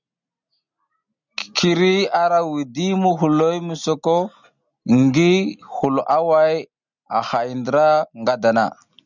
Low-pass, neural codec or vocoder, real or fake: 7.2 kHz; none; real